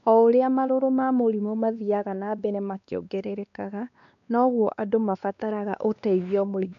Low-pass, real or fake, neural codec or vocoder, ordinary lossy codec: 7.2 kHz; fake; codec, 16 kHz, 2 kbps, X-Codec, WavLM features, trained on Multilingual LibriSpeech; MP3, 96 kbps